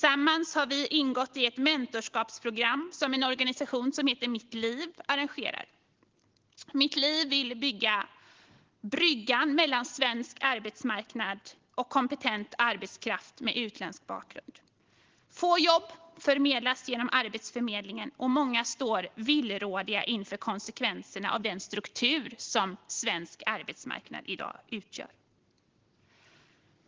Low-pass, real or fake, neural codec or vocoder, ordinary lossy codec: 7.2 kHz; real; none; Opus, 16 kbps